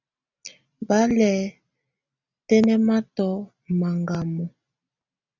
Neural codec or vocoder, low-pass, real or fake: none; 7.2 kHz; real